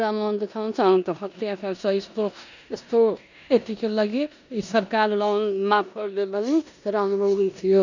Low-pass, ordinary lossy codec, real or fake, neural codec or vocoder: 7.2 kHz; none; fake; codec, 16 kHz in and 24 kHz out, 0.9 kbps, LongCat-Audio-Codec, four codebook decoder